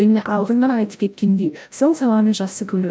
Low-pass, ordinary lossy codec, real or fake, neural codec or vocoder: none; none; fake; codec, 16 kHz, 0.5 kbps, FreqCodec, larger model